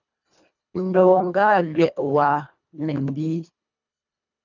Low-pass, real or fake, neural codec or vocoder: 7.2 kHz; fake; codec, 24 kHz, 1.5 kbps, HILCodec